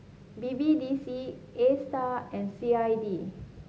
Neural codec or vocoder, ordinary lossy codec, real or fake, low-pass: none; none; real; none